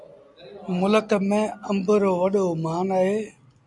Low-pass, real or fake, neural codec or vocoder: 10.8 kHz; real; none